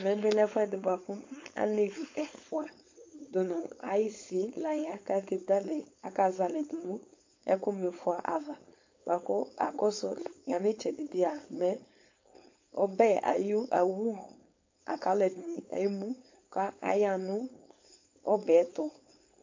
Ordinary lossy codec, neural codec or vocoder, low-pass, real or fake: MP3, 48 kbps; codec, 16 kHz, 4.8 kbps, FACodec; 7.2 kHz; fake